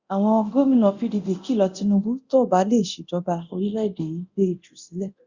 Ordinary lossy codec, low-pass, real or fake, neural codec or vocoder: Opus, 64 kbps; 7.2 kHz; fake; codec, 24 kHz, 0.9 kbps, DualCodec